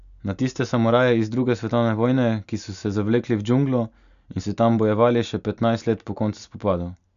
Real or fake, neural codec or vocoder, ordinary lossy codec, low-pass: real; none; none; 7.2 kHz